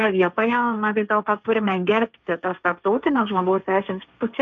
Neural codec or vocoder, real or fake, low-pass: codec, 16 kHz, 1.1 kbps, Voila-Tokenizer; fake; 7.2 kHz